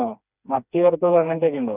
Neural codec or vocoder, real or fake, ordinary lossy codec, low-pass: codec, 16 kHz, 2 kbps, FreqCodec, smaller model; fake; none; 3.6 kHz